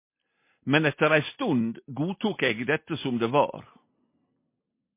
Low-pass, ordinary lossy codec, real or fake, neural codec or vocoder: 3.6 kHz; MP3, 24 kbps; real; none